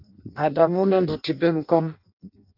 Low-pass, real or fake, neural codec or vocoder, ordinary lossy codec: 5.4 kHz; fake; codec, 16 kHz in and 24 kHz out, 0.6 kbps, FireRedTTS-2 codec; MP3, 48 kbps